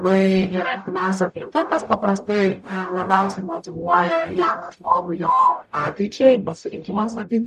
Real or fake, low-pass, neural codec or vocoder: fake; 14.4 kHz; codec, 44.1 kHz, 0.9 kbps, DAC